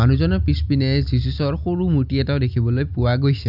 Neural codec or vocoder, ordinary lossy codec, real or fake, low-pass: none; none; real; 5.4 kHz